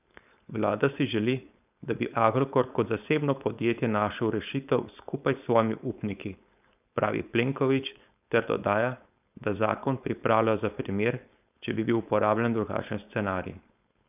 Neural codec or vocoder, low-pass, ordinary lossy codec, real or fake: codec, 16 kHz, 4.8 kbps, FACodec; 3.6 kHz; none; fake